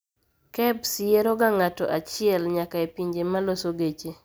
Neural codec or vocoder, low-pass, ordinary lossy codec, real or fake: none; none; none; real